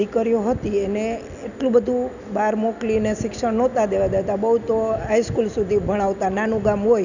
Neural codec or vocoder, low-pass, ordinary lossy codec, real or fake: none; 7.2 kHz; none; real